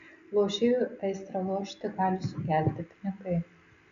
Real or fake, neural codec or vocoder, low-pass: real; none; 7.2 kHz